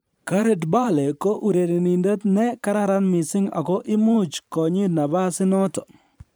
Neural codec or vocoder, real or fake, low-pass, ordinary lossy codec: vocoder, 44.1 kHz, 128 mel bands every 512 samples, BigVGAN v2; fake; none; none